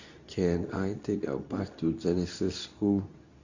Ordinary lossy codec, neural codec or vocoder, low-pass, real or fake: Opus, 64 kbps; codec, 24 kHz, 0.9 kbps, WavTokenizer, medium speech release version 2; 7.2 kHz; fake